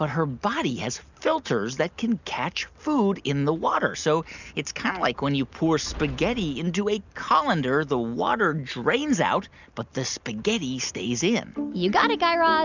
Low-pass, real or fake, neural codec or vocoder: 7.2 kHz; real; none